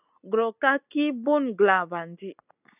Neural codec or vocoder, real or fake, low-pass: none; real; 3.6 kHz